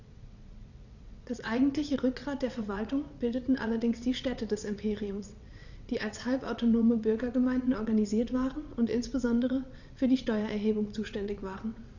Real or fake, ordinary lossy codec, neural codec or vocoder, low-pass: fake; none; vocoder, 22.05 kHz, 80 mel bands, WaveNeXt; 7.2 kHz